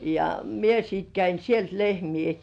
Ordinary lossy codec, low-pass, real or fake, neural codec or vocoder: none; 9.9 kHz; real; none